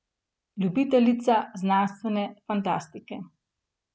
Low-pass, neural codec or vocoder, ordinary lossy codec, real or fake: none; none; none; real